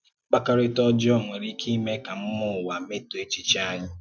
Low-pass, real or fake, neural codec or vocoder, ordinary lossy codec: none; real; none; none